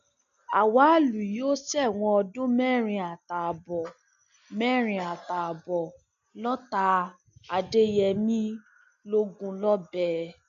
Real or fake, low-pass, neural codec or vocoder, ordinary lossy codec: real; 7.2 kHz; none; none